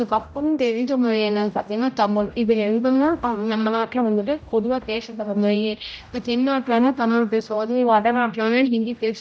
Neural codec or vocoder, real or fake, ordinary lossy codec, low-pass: codec, 16 kHz, 0.5 kbps, X-Codec, HuBERT features, trained on general audio; fake; none; none